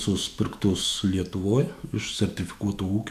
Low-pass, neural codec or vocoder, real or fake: 14.4 kHz; autoencoder, 48 kHz, 128 numbers a frame, DAC-VAE, trained on Japanese speech; fake